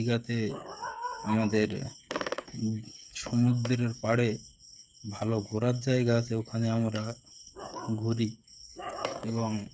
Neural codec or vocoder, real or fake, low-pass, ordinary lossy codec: codec, 16 kHz, 8 kbps, FreqCodec, smaller model; fake; none; none